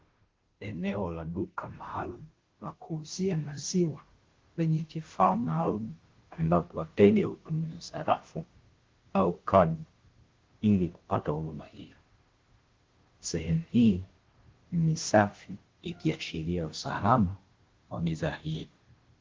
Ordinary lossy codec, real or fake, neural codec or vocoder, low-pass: Opus, 16 kbps; fake; codec, 16 kHz, 0.5 kbps, FunCodec, trained on Chinese and English, 25 frames a second; 7.2 kHz